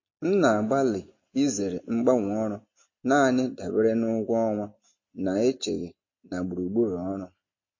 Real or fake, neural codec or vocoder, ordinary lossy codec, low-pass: real; none; MP3, 32 kbps; 7.2 kHz